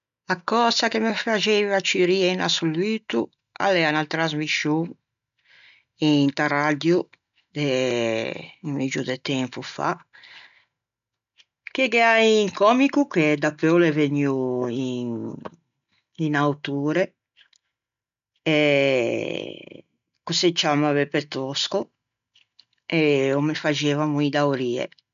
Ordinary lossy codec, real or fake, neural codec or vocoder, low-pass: none; real; none; 7.2 kHz